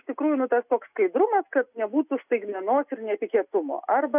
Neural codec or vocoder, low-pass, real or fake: none; 3.6 kHz; real